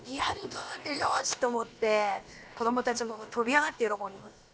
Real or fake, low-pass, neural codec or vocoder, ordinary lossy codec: fake; none; codec, 16 kHz, about 1 kbps, DyCAST, with the encoder's durations; none